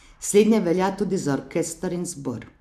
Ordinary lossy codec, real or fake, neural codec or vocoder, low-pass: AAC, 96 kbps; real; none; 14.4 kHz